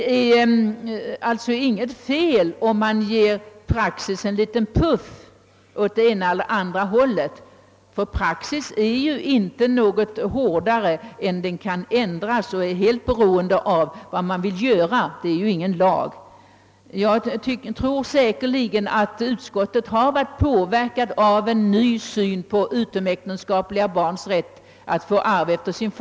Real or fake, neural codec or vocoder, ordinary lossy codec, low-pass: real; none; none; none